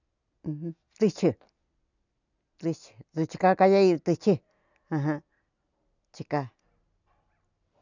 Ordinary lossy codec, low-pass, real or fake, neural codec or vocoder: none; 7.2 kHz; real; none